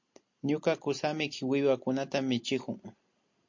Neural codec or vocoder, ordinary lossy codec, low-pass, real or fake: none; MP3, 48 kbps; 7.2 kHz; real